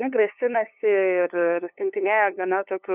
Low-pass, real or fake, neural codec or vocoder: 3.6 kHz; fake; codec, 16 kHz, 2 kbps, FunCodec, trained on LibriTTS, 25 frames a second